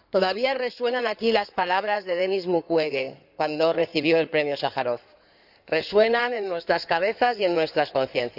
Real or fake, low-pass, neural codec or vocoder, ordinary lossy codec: fake; 5.4 kHz; codec, 16 kHz in and 24 kHz out, 2.2 kbps, FireRedTTS-2 codec; none